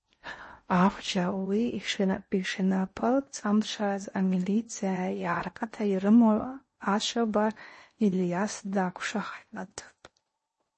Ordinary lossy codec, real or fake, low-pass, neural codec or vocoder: MP3, 32 kbps; fake; 10.8 kHz; codec, 16 kHz in and 24 kHz out, 0.6 kbps, FocalCodec, streaming, 4096 codes